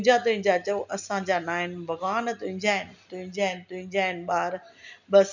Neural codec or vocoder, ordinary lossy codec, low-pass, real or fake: none; none; 7.2 kHz; real